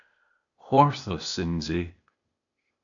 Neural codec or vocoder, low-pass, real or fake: codec, 16 kHz, 0.8 kbps, ZipCodec; 7.2 kHz; fake